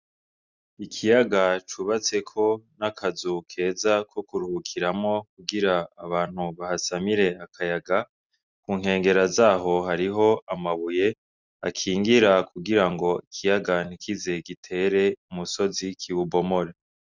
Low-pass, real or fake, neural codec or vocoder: 7.2 kHz; real; none